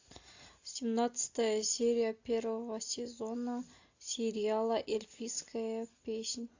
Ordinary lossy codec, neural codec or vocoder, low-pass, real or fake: AAC, 48 kbps; none; 7.2 kHz; real